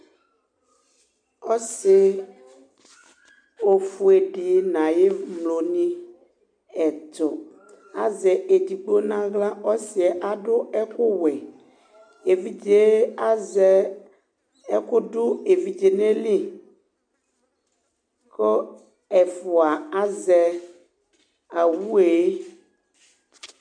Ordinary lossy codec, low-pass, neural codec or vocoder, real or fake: MP3, 64 kbps; 9.9 kHz; none; real